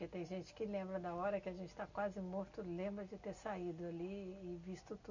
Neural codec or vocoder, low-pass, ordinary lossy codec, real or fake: none; 7.2 kHz; none; real